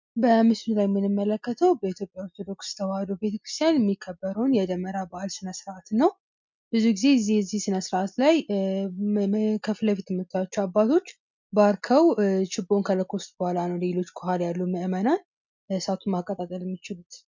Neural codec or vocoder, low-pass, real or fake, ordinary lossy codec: none; 7.2 kHz; real; MP3, 64 kbps